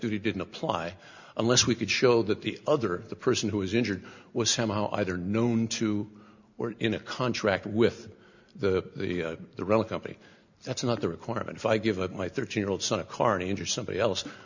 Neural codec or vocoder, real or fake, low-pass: none; real; 7.2 kHz